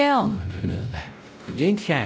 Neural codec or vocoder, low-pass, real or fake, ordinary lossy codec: codec, 16 kHz, 0.5 kbps, X-Codec, WavLM features, trained on Multilingual LibriSpeech; none; fake; none